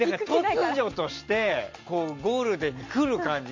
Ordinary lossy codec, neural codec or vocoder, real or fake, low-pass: MP3, 48 kbps; none; real; 7.2 kHz